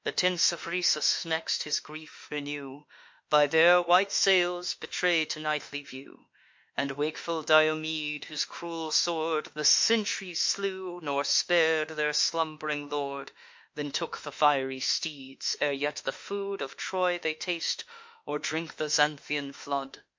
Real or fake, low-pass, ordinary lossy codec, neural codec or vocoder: fake; 7.2 kHz; MP3, 48 kbps; autoencoder, 48 kHz, 32 numbers a frame, DAC-VAE, trained on Japanese speech